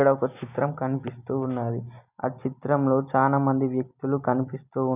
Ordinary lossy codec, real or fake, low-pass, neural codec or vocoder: none; real; 3.6 kHz; none